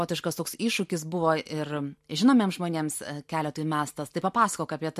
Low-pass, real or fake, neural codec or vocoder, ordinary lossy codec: 14.4 kHz; real; none; MP3, 64 kbps